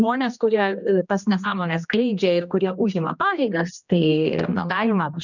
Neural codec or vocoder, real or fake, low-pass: codec, 16 kHz, 1 kbps, X-Codec, HuBERT features, trained on general audio; fake; 7.2 kHz